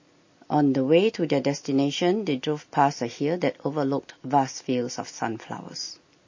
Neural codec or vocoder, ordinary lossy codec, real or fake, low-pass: none; MP3, 32 kbps; real; 7.2 kHz